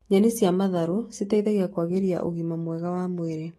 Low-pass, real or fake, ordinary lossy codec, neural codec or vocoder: 19.8 kHz; fake; AAC, 32 kbps; autoencoder, 48 kHz, 128 numbers a frame, DAC-VAE, trained on Japanese speech